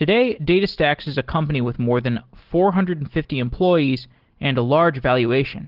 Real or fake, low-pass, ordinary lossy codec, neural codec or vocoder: real; 5.4 kHz; Opus, 16 kbps; none